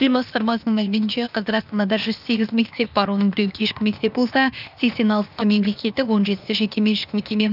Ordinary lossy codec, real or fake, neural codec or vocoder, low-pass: none; fake; codec, 16 kHz, 0.8 kbps, ZipCodec; 5.4 kHz